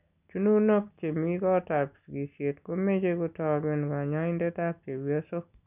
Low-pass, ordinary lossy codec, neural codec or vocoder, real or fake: 3.6 kHz; none; none; real